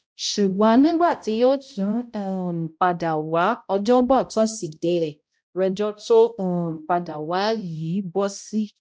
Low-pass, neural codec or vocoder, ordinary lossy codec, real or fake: none; codec, 16 kHz, 0.5 kbps, X-Codec, HuBERT features, trained on balanced general audio; none; fake